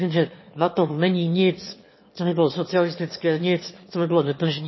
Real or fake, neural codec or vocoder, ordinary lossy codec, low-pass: fake; autoencoder, 22.05 kHz, a latent of 192 numbers a frame, VITS, trained on one speaker; MP3, 24 kbps; 7.2 kHz